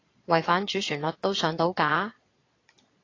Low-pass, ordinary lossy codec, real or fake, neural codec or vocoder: 7.2 kHz; AAC, 32 kbps; fake; vocoder, 22.05 kHz, 80 mel bands, Vocos